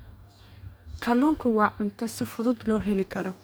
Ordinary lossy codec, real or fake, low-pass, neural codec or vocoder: none; fake; none; codec, 44.1 kHz, 2.6 kbps, DAC